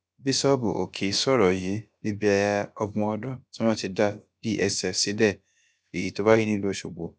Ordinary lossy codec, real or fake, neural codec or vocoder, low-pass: none; fake; codec, 16 kHz, about 1 kbps, DyCAST, with the encoder's durations; none